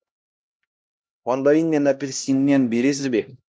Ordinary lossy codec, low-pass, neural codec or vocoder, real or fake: none; none; codec, 16 kHz, 1 kbps, X-Codec, HuBERT features, trained on LibriSpeech; fake